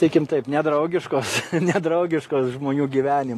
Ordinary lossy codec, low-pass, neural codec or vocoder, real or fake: AAC, 48 kbps; 14.4 kHz; none; real